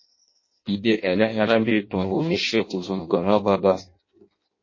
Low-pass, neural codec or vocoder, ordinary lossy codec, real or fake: 7.2 kHz; codec, 16 kHz in and 24 kHz out, 0.6 kbps, FireRedTTS-2 codec; MP3, 32 kbps; fake